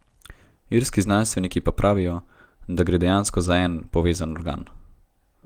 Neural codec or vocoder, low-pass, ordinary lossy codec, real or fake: none; 19.8 kHz; Opus, 24 kbps; real